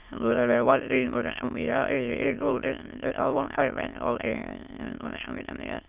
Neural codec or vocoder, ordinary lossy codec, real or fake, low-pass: autoencoder, 22.05 kHz, a latent of 192 numbers a frame, VITS, trained on many speakers; Opus, 24 kbps; fake; 3.6 kHz